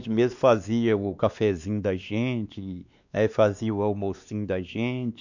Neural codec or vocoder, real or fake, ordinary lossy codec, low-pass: codec, 16 kHz, 2 kbps, X-Codec, WavLM features, trained on Multilingual LibriSpeech; fake; none; 7.2 kHz